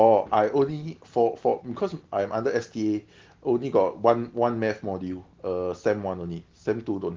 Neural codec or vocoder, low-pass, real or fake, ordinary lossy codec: none; 7.2 kHz; real; Opus, 16 kbps